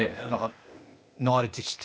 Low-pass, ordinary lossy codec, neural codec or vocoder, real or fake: none; none; codec, 16 kHz, 0.8 kbps, ZipCodec; fake